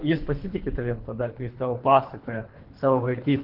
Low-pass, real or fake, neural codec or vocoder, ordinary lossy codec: 5.4 kHz; fake; codec, 24 kHz, 3 kbps, HILCodec; Opus, 16 kbps